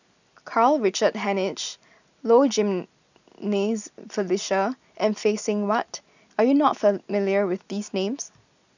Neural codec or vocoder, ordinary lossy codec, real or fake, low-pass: none; none; real; 7.2 kHz